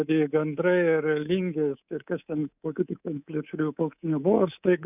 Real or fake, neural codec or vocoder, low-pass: fake; codec, 24 kHz, 3.1 kbps, DualCodec; 3.6 kHz